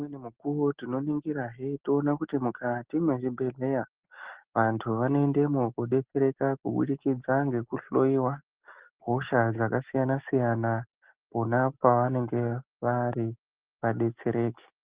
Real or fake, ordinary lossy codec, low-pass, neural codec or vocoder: real; Opus, 16 kbps; 3.6 kHz; none